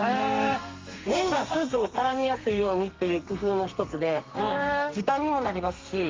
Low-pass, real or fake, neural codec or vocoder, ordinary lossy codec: 7.2 kHz; fake; codec, 32 kHz, 1.9 kbps, SNAC; Opus, 32 kbps